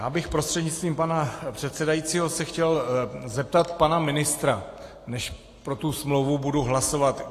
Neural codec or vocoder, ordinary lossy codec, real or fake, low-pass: none; AAC, 48 kbps; real; 14.4 kHz